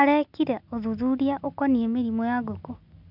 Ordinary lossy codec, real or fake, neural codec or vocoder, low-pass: none; real; none; 5.4 kHz